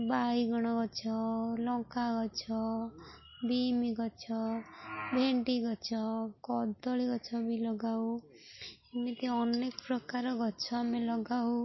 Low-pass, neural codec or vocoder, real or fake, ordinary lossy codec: 7.2 kHz; none; real; MP3, 24 kbps